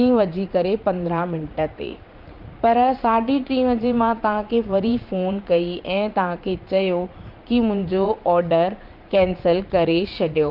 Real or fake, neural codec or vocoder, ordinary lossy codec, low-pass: fake; vocoder, 44.1 kHz, 80 mel bands, Vocos; Opus, 24 kbps; 5.4 kHz